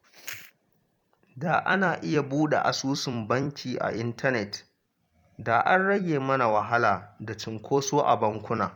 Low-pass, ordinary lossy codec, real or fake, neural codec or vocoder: 19.8 kHz; MP3, 96 kbps; fake; vocoder, 44.1 kHz, 128 mel bands every 256 samples, BigVGAN v2